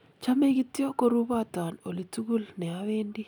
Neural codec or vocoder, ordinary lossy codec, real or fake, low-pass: none; none; real; 19.8 kHz